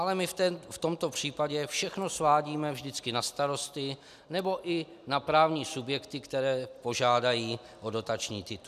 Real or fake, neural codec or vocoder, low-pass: real; none; 14.4 kHz